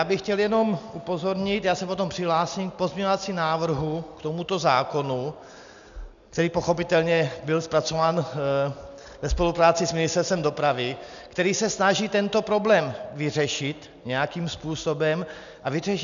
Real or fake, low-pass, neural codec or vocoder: real; 7.2 kHz; none